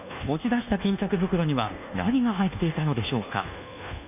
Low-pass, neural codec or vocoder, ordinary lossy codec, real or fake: 3.6 kHz; codec, 24 kHz, 1.2 kbps, DualCodec; none; fake